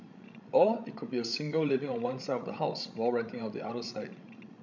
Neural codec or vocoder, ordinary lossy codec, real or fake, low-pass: codec, 16 kHz, 16 kbps, FreqCodec, larger model; none; fake; 7.2 kHz